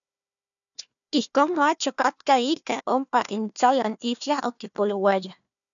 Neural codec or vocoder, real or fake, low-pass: codec, 16 kHz, 1 kbps, FunCodec, trained on Chinese and English, 50 frames a second; fake; 7.2 kHz